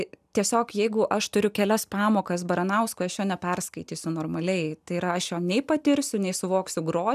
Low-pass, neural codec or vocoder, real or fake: 14.4 kHz; none; real